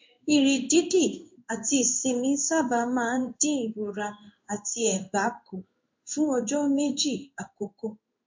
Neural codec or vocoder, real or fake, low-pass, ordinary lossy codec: codec, 16 kHz in and 24 kHz out, 1 kbps, XY-Tokenizer; fake; 7.2 kHz; MP3, 48 kbps